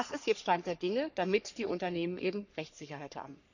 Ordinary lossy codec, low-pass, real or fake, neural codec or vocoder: none; 7.2 kHz; fake; codec, 44.1 kHz, 3.4 kbps, Pupu-Codec